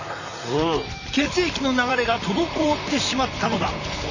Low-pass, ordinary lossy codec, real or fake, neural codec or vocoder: 7.2 kHz; none; fake; vocoder, 44.1 kHz, 128 mel bands, Pupu-Vocoder